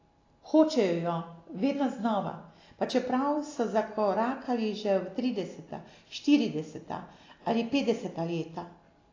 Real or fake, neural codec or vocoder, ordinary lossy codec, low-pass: real; none; AAC, 32 kbps; 7.2 kHz